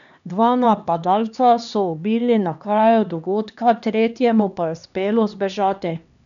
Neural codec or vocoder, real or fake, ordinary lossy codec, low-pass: codec, 16 kHz, 2 kbps, X-Codec, HuBERT features, trained on LibriSpeech; fake; none; 7.2 kHz